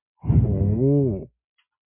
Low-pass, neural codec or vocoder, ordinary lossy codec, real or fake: 3.6 kHz; none; MP3, 32 kbps; real